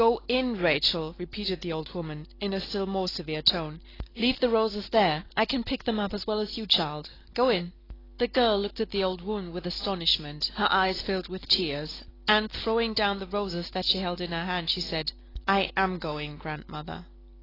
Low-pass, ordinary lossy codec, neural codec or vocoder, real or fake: 5.4 kHz; AAC, 24 kbps; none; real